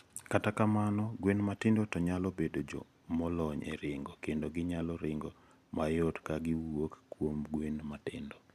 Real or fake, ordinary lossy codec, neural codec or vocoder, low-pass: real; none; none; 14.4 kHz